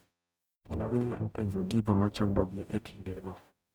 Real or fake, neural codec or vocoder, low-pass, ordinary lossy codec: fake; codec, 44.1 kHz, 0.9 kbps, DAC; none; none